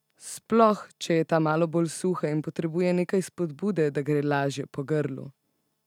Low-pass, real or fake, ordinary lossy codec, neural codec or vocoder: 19.8 kHz; real; none; none